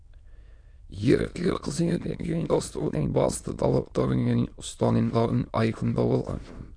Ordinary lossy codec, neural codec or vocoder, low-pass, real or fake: AAC, 64 kbps; autoencoder, 22.05 kHz, a latent of 192 numbers a frame, VITS, trained on many speakers; 9.9 kHz; fake